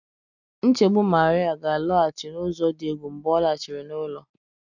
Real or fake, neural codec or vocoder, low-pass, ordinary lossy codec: real; none; 7.2 kHz; none